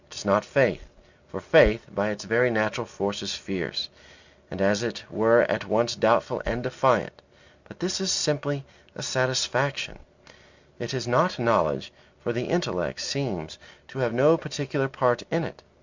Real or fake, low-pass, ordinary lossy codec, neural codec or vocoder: real; 7.2 kHz; Opus, 64 kbps; none